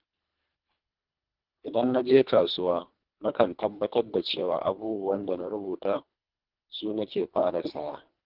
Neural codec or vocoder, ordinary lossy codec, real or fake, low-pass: codec, 24 kHz, 1.5 kbps, HILCodec; Opus, 24 kbps; fake; 5.4 kHz